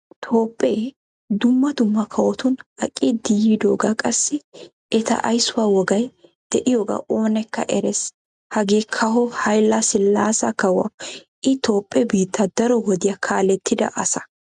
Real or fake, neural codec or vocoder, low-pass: real; none; 10.8 kHz